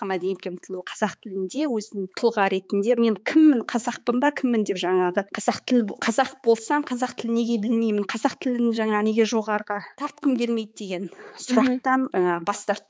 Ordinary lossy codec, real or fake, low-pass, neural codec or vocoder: none; fake; none; codec, 16 kHz, 4 kbps, X-Codec, HuBERT features, trained on balanced general audio